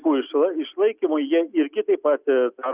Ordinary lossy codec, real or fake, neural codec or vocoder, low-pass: Opus, 64 kbps; real; none; 3.6 kHz